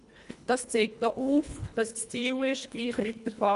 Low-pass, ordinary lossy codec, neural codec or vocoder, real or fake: 10.8 kHz; none; codec, 24 kHz, 1.5 kbps, HILCodec; fake